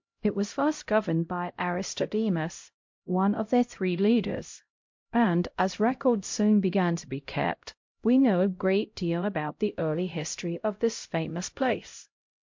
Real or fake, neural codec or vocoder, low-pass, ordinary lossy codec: fake; codec, 16 kHz, 0.5 kbps, X-Codec, HuBERT features, trained on LibriSpeech; 7.2 kHz; MP3, 48 kbps